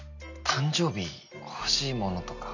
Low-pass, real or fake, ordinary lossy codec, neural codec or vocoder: 7.2 kHz; real; none; none